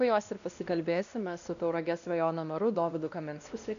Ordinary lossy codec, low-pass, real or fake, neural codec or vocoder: AAC, 96 kbps; 7.2 kHz; fake; codec, 16 kHz, 1 kbps, X-Codec, WavLM features, trained on Multilingual LibriSpeech